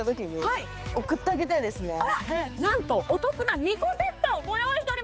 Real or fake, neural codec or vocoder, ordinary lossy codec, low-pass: fake; codec, 16 kHz, 4 kbps, X-Codec, HuBERT features, trained on balanced general audio; none; none